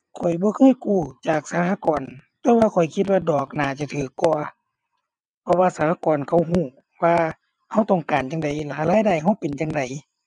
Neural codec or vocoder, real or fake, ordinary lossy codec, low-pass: vocoder, 22.05 kHz, 80 mel bands, WaveNeXt; fake; none; 9.9 kHz